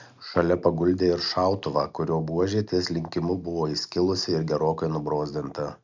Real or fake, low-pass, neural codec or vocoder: real; 7.2 kHz; none